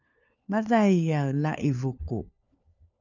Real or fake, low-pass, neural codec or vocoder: fake; 7.2 kHz; codec, 16 kHz, 4 kbps, FunCodec, trained on LibriTTS, 50 frames a second